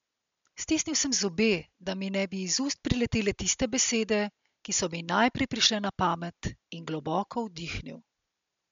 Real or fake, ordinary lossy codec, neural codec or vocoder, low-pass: real; MP3, 64 kbps; none; 7.2 kHz